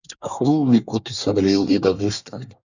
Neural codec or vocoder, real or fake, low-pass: codec, 24 kHz, 1 kbps, SNAC; fake; 7.2 kHz